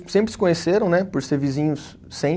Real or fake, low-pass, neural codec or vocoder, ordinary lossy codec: real; none; none; none